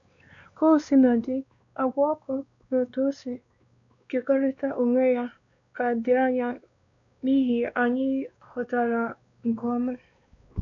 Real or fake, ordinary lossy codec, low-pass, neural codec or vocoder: fake; none; 7.2 kHz; codec, 16 kHz, 2 kbps, X-Codec, WavLM features, trained on Multilingual LibriSpeech